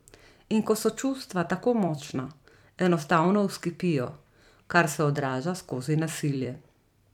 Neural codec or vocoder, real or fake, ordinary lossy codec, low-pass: vocoder, 44.1 kHz, 128 mel bands every 256 samples, BigVGAN v2; fake; none; 19.8 kHz